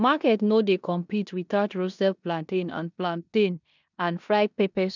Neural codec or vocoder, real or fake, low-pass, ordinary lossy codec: codec, 16 kHz in and 24 kHz out, 0.9 kbps, LongCat-Audio-Codec, four codebook decoder; fake; 7.2 kHz; none